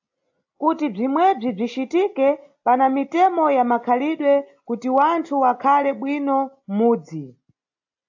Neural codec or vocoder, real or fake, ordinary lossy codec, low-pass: none; real; AAC, 48 kbps; 7.2 kHz